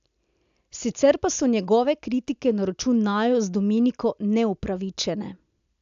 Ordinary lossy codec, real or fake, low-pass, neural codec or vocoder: AAC, 96 kbps; real; 7.2 kHz; none